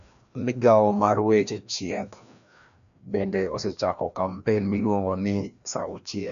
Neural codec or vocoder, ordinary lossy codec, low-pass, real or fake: codec, 16 kHz, 1 kbps, FreqCodec, larger model; none; 7.2 kHz; fake